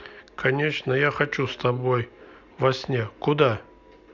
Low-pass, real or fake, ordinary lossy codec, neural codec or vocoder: 7.2 kHz; real; none; none